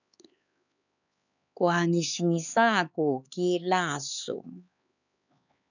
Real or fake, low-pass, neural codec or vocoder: fake; 7.2 kHz; codec, 16 kHz, 4 kbps, X-Codec, HuBERT features, trained on LibriSpeech